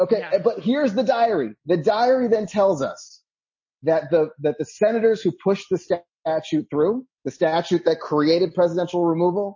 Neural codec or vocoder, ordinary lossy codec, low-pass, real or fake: none; MP3, 32 kbps; 7.2 kHz; real